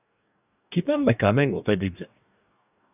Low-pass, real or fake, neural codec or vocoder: 3.6 kHz; fake; codec, 44.1 kHz, 2.6 kbps, DAC